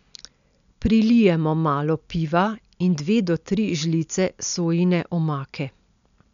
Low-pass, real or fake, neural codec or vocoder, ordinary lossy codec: 7.2 kHz; real; none; none